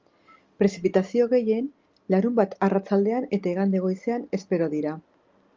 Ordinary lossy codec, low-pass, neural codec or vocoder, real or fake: Opus, 32 kbps; 7.2 kHz; none; real